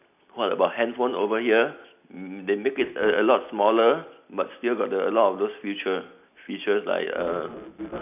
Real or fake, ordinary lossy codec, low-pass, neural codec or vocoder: real; none; 3.6 kHz; none